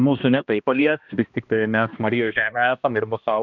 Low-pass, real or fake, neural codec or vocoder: 7.2 kHz; fake; codec, 16 kHz, 1 kbps, X-Codec, HuBERT features, trained on balanced general audio